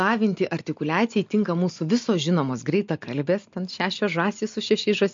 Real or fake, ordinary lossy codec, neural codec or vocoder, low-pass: real; MP3, 48 kbps; none; 7.2 kHz